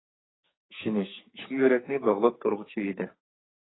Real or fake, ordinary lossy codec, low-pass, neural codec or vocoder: fake; AAC, 16 kbps; 7.2 kHz; codec, 44.1 kHz, 2.6 kbps, SNAC